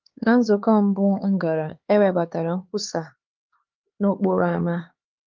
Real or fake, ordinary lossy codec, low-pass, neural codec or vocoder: fake; Opus, 24 kbps; 7.2 kHz; codec, 16 kHz, 4 kbps, X-Codec, HuBERT features, trained on LibriSpeech